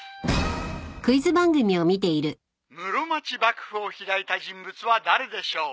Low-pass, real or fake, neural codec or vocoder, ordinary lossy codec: none; real; none; none